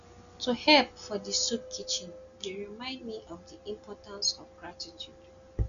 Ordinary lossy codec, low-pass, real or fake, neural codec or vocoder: none; 7.2 kHz; real; none